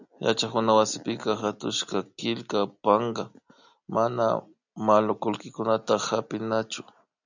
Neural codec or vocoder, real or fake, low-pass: none; real; 7.2 kHz